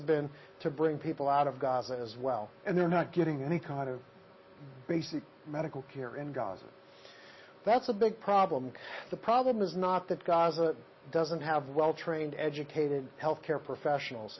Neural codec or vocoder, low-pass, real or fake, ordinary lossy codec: none; 7.2 kHz; real; MP3, 24 kbps